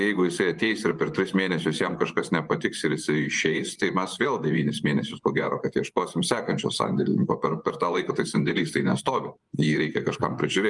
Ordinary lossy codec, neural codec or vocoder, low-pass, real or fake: Opus, 32 kbps; vocoder, 24 kHz, 100 mel bands, Vocos; 10.8 kHz; fake